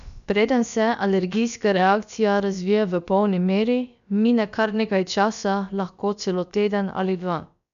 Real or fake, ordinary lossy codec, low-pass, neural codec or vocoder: fake; none; 7.2 kHz; codec, 16 kHz, about 1 kbps, DyCAST, with the encoder's durations